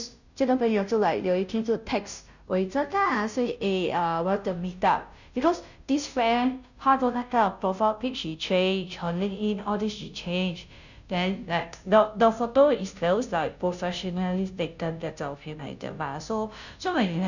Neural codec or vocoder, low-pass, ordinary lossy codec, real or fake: codec, 16 kHz, 0.5 kbps, FunCodec, trained on Chinese and English, 25 frames a second; 7.2 kHz; none; fake